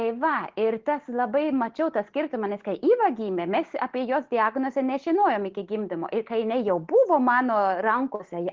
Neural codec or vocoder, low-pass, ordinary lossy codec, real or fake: none; 7.2 kHz; Opus, 16 kbps; real